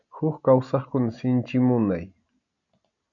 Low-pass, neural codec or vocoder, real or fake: 7.2 kHz; none; real